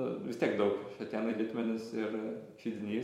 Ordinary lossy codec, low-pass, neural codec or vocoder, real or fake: MP3, 64 kbps; 19.8 kHz; vocoder, 44.1 kHz, 128 mel bands every 256 samples, BigVGAN v2; fake